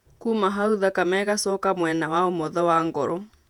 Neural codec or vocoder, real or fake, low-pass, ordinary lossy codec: vocoder, 48 kHz, 128 mel bands, Vocos; fake; 19.8 kHz; none